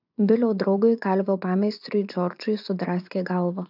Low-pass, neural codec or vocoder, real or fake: 5.4 kHz; vocoder, 24 kHz, 100 mel bands, Vocos; fake